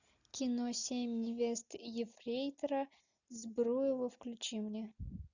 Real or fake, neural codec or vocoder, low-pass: real; none; 7.2 kHz